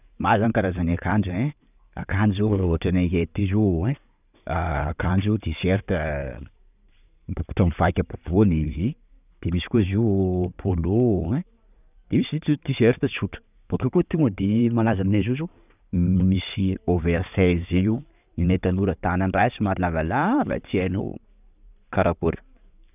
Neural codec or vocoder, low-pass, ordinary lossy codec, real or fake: vocoder, 44.1 kHz, 128 mel bands every 512 samples, BigVGAN v2; 3.6 kHz; none; fake